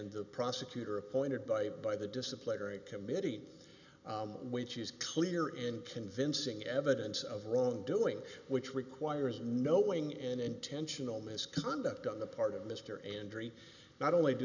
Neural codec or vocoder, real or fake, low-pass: none; real; 7.2 kHz